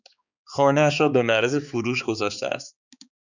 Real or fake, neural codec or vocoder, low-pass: fake; codec, 16 kHz, 4 kbps, X-Codec, HuBERT features, trained on balanced general audio; 7.2 kHz